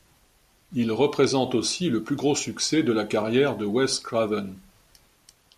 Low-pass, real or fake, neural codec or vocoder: 14.4 kHz; real; none